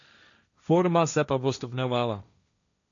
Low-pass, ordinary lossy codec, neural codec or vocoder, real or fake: 7.2 kHz; none; codec, 16 kHz, 1.1 kbps, Voila-Tokenizer; fake